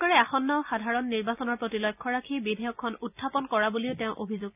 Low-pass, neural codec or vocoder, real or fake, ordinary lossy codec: 3.6 kHz; none; real; none